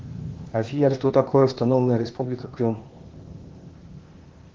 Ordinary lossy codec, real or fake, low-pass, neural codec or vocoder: Opus, 16 kbps; fake; 7.2 kHz; codec, 16 kHz, 0.8 kbps, ZipCodec